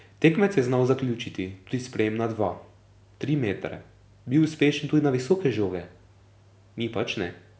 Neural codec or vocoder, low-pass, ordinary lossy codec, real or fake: none; none; none; real